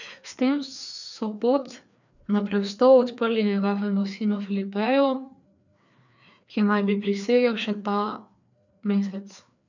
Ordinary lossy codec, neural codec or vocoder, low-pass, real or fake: none; codec, 16 kHz, 2 kbps, FreqCodec, larger model; 7.2 kHz; fake